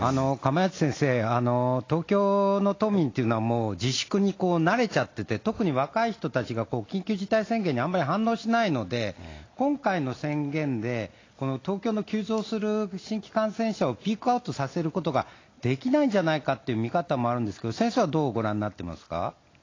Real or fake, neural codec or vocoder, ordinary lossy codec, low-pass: real; none; AAC, 32 kbps; 7.2 kHz